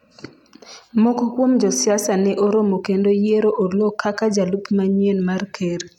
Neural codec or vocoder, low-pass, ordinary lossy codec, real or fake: none; 19.8 kHz; none; real